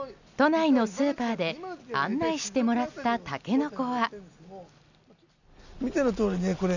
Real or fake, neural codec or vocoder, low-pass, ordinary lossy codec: real; none; 7.2 kHz; none